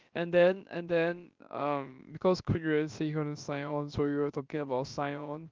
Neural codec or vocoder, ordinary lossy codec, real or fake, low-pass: codec, 16 kHz, about 1 kbps, DyCAST, with the encoder's durations; Opus, 24 kbps; fake; 7.2 kHz